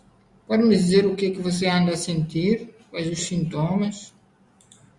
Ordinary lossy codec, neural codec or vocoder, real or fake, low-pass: Opus, 64 kbps; none; real; 10.8 kHz